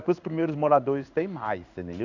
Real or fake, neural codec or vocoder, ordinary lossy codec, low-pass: fake; codec, 16 kHz in and 24 kHz out, 1 kbps, XY-Tokenizer; none; 7.2 kHz